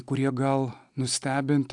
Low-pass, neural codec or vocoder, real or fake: 10.8 kHz; none; real